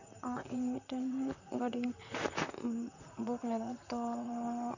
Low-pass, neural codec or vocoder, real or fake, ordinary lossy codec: 7.2 kHz; vocoder, 44.1 kHz, 128 mel bands, Pupu-Vocoder; fake; none